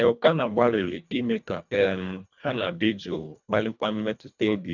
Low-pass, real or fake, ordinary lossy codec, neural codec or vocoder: 7.2 kHz; fake; none; codec, 24 kHz, 1.5 kbps, HILCodec